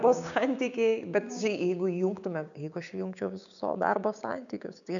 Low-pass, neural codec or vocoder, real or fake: 7.2 kHz; codec, 16 kHz, 6 kbps, DAC; fake